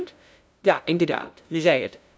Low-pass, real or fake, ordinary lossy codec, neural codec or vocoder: none; fake; none; codec, 16 kHz, 0.5 kbps, FunCodec, trained on LibriTTS, 25 frames a second